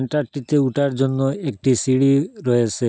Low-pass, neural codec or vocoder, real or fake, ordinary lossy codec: none; none; real; none